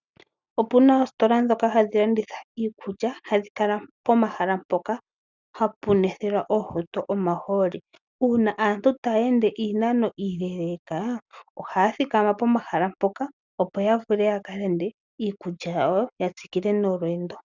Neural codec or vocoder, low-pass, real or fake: none; 7.2 kHz; real